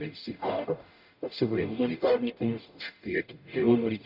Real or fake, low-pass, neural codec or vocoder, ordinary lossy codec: fake; 5.4 kHz; codec, 44.1 kHz, 0.9 kbps, DAC; none